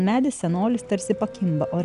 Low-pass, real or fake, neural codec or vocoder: 10.8 kHz; real; none